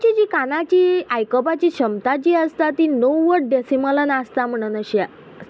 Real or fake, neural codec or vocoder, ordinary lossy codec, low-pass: real; none; none; none